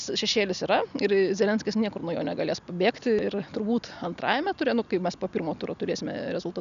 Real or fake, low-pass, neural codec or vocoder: real; 7.2 kHz; none